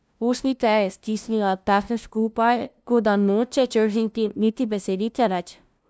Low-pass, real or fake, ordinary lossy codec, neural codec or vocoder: none; fake; none; codec, 16 kHz, 0.5 kbps, FunCodec, trained on LibriTTS, 25 frames a second